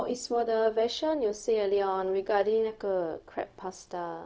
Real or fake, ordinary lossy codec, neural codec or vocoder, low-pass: fake; none; codec, 16 kHz, 0.4 kbps, LongCat-Audio-Codec; none